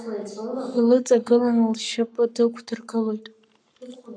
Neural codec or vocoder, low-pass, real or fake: codec, 44.1 kHz, 7.8 kbps, Pupu-Codec; 9.9 kHz; fake